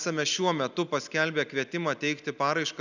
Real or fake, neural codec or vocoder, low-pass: real; none; 7.2 kHz